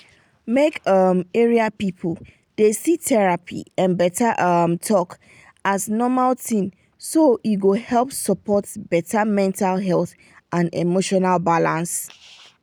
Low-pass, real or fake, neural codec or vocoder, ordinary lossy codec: none; real; none; none